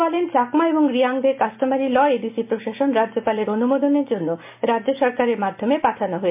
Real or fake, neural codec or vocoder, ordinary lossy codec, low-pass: real; none; MP3, 32 kbps; 3.6 kHz